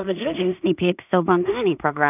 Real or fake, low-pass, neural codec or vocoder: fake; 3.6 kHz; codec, 16 kHz in and 24 kHz out, 0.4 kbps, LongCat-Audio-Codec, two codebook decoder